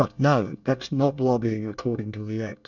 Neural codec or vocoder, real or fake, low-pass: codec, 24 kHz, 1 kbps, SNAC; fake; 7.2 kHz